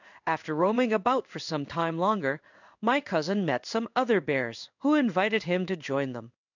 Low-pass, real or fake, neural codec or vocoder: 7.2 kHz; fake; codec, 16 kHz in and 24 kHz out, 1 kbps, XY-Tokenizer